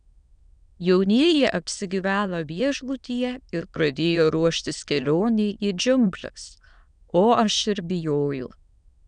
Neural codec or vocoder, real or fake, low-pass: autoencoder, 22.05 kHz, a latent of 192 numbers a frame, VITS, trained on many speakers; fake; 9.9 kHz